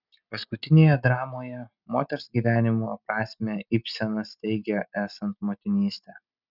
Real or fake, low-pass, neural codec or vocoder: real; 5.4 kHz; none